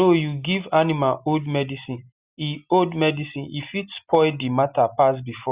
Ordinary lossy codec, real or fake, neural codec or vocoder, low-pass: Opus, 64 kbps; real; none; 3.6 kHz